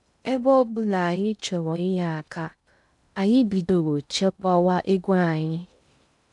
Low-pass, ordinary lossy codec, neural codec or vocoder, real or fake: 10.8 kHz; none; codec, 16 kHz in and 24 kHz out, 0.6 kbps, FocalCodec, streaming, 2048 codes; fake